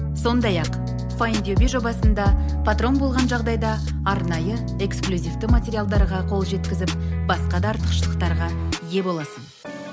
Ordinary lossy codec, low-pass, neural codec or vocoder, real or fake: none; none; none; real